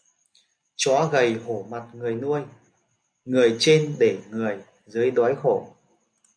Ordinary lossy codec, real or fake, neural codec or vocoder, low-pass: MP3, 96 kbps; real; none; 9.9 kHz